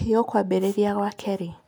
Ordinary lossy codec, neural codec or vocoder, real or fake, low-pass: none; none; real; none